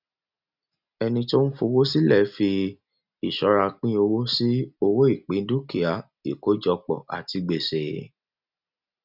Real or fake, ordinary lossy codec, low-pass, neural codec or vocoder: real; none; 5.4 kHz; none